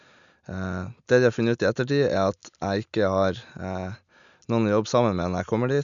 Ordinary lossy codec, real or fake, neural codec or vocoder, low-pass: none; real; none; 7.2 kHz